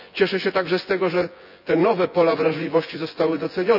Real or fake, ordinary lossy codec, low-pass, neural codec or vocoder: fake; AAC, 48 kbps; 5.4 kHz; vocoder, 24 kHz, 100 mel bands, Vocos